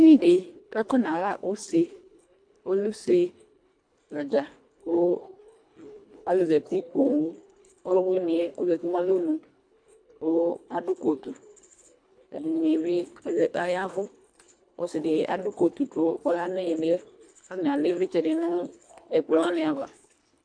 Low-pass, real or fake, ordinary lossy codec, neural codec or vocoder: 9.9 kHz; fake; AAC, 64 kbps; codec, 24 kHz, 1.5 kbps, HILCodec